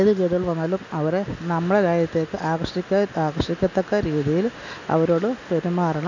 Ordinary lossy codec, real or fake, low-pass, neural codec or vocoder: none; real; 7.2 kHz; none